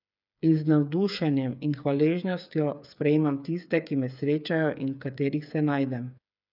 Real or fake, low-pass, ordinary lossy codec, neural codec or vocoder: fake; 5.4 kHz; none; codec, 16 kHz, 8 kbps, FreqCodec, smaller model